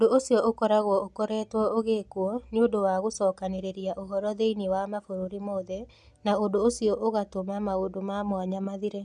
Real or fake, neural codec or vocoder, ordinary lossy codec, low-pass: real; none; none; none